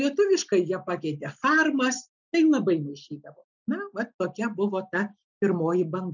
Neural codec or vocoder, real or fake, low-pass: none; real; 7.2 kHz